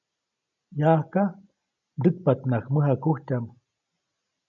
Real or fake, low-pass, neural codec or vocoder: real; 7.2 kHz; none